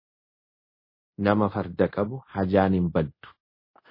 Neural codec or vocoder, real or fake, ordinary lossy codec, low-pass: codec, 24 kHz, 0.5 kbps, DualCodec; fake; MP3, 24 kbps; 5.4 kHz